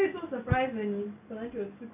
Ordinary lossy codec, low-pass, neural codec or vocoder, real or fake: MP3, 32 kbps; 3.6 kHz; none; real